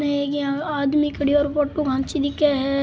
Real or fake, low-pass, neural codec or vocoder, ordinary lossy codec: real; none; none; none